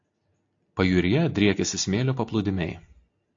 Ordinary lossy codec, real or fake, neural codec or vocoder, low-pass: AAC, 48 kbps; real; none; 7.2 kHz